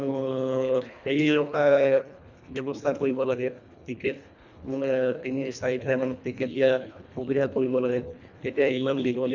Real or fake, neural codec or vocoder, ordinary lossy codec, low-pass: fake; codec, 24 kHz, 1.5 kbps, HILCodec; none; 7.2 kHz